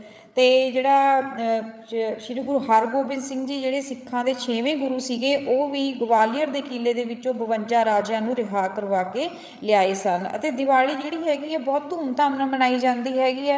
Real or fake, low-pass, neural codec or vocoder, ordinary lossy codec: fake; none; codec, 16 kHz, 8 kbps, FreqCodec, larger model; none